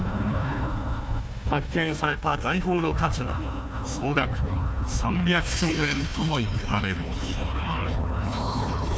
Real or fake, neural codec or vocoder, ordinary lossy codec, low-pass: fake; codec, 16 kHz, 1 kbps, FunCodec, trained on Chinese and English, 50 frames a second; none; none